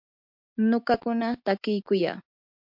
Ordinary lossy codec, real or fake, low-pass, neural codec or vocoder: MP3, 48 kbps; real; 5.4 kHz; none